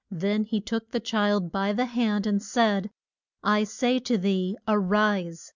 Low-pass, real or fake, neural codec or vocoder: 7.2 kHz; real; none